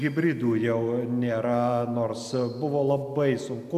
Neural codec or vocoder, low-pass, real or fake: none; 14.4 kHz; real